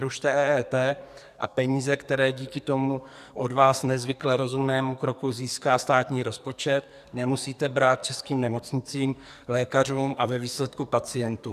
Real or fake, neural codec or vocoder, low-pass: fake; codec, 44.1 kHz, 2.6 kbps, SNAC; 14.4 kHz